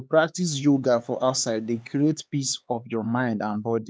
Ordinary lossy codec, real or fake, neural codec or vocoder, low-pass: none; fake; codec, 16 kHz, 4 kbps, X-Codec, HuBERT features, trained on LibriSpeech; none